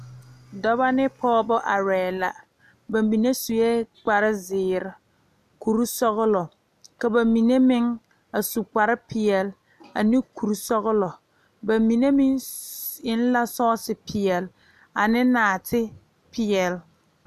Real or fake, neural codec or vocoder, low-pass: real; none; 14.4 kHz